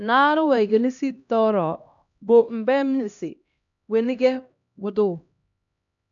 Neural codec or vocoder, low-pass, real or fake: codec, 16 kHz, 1 kbps, X-Codec, HuBERT features, trained on LibriSpeech; 7.2 kHz; fake